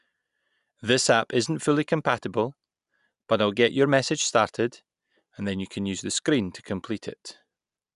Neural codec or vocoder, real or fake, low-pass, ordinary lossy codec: none; real; 10.8 kHz; none